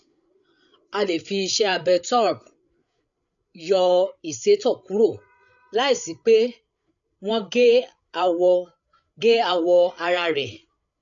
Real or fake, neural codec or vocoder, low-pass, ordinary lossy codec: fake; codec, 16 kHz, 8 kbps, FreqCodec, larger model; 7.2 kHz; none